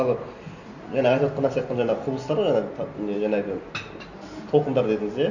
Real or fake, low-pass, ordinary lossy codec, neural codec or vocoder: real; 7.2 kHz; none; none